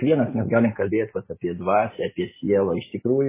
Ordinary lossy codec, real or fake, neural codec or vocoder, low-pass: MP3, 16 kbps; real; none; 3.6 kHz